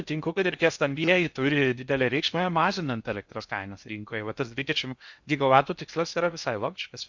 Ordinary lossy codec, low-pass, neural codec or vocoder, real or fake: Opus, 64 kbps; 7.2 kHz; codec, 16 kHz in and 24 kHz out, 0.6 kbps, FocalCodec, streaming, 2048 codes; fake